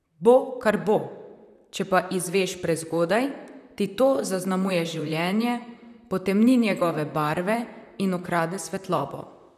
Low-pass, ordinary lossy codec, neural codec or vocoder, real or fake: 14.4 kHz; none; vocoder, 44.1 kHz, 128 mel bands, Pupu-Vocoder; fake